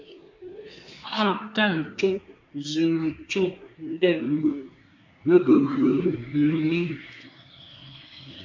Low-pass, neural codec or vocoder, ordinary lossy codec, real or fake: 7.2 kHz; codec, 24 kHz, 1 kbps, SNAC; MP3, 64 kbps; fake